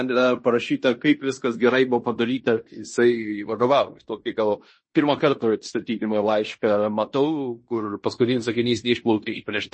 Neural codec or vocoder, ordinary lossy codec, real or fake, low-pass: codec, 16 kHz in and 24 kHz out, 0.9 kbps, LongCat-Audio-Codec, fine tuned four codebook decoder; MP3, 32 kbps; fake; 9.9 kHz